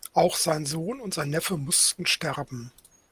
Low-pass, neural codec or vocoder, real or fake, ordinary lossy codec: 14.4 kHz; none; real; Opus, 32 kbps